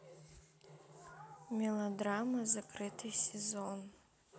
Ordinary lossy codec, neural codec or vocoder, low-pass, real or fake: none; none; none; real